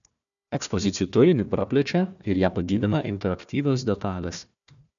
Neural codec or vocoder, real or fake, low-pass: codec, 16 kHz, 1 kbps, FunCodec, trained on Chinese and English, 50 frames a second; fake; 7.2 kHz